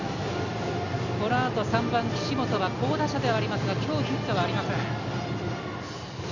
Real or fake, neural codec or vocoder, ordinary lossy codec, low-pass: real; none; none; 7.2 kHz